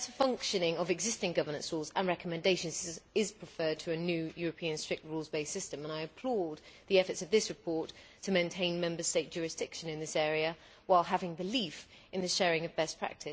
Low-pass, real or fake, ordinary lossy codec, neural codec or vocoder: none; real; none; none